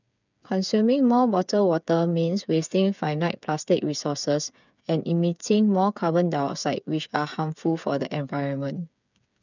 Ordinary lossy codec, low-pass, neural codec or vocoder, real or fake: none; 7.2 kHz; codec, 16 kHz, 8 kbps, FreqCodec, smaller model; fake